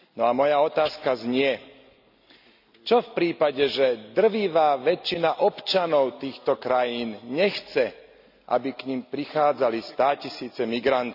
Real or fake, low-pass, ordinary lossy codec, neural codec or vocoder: real; 5.4 kHz; none; none